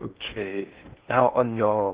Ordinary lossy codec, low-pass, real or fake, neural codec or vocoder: Opus, 16 kbps; 3.6 kHz; fake; codec, 16 kHz in and 24 kHz out, 0.6 kbps, FocalCodec, streaming, 2048 codes